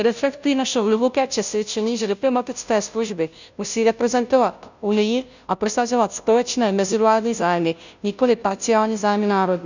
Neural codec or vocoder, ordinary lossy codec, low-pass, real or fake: codec, 16 kHz, 0.5 kbps, FunCodec, trained on Chinese and English, 25 frames a second; none; 7.2 kHz; fake